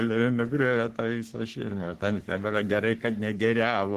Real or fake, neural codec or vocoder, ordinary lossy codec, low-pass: fake; codec, 44.1 kHz, 3.4 kbps, Pupu-Codec; Opus, 16 kbps; 14.4 kHz